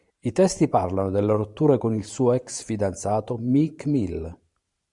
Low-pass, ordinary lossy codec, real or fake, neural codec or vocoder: 10.8 kHz; MP3, 96 kbps; fake; vocoder, 44.1 kHz, 128 mel bands every 512 samples, BigVGAN v2